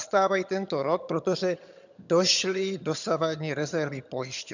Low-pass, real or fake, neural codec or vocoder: 7.2 kHz; fake; vocoder, 22.05 kHz, 80 mel bands, HiFi-GAN